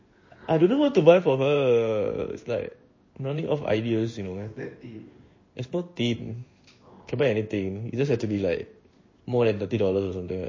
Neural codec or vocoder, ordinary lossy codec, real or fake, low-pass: codec, 16 kHz in and 24 kHz out, 1 kbps, XY-Tokenizer; MP3, 32 kbps; fake; 7.2 kHz